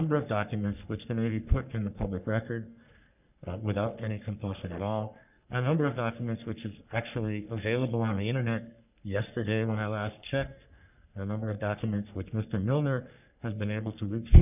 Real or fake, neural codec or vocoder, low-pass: fake; codec, 44.1 kHz, 3.4 kbps, Pupu-Codec; 3.6 kHz